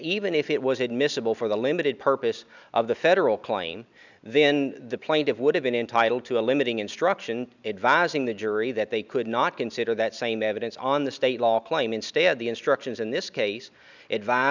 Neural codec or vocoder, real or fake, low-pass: none; real; 7.2 kHz